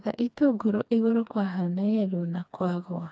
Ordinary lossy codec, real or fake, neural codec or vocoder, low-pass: none; fake; codec, 16 kHz, 2 kbps, FreqCodec, smaller model; none